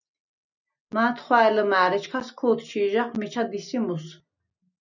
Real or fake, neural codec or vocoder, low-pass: real; none; 7.2 kHz